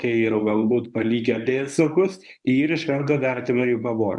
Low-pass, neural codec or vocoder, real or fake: 10.8 kHz; codec, 24 kHz, 0.9 kbps, WavTokenizer, medium speech release version 1; fake